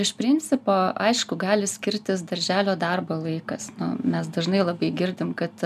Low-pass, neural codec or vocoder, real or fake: 14.4 kHz; none; real